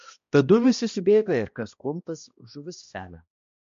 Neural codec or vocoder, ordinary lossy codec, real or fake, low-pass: codec, 16 kHz, 1 kbps, X-Codec, HuBERT features, trained on balanced general audio; MP3, 48 kbps; fake; 7.2 kHz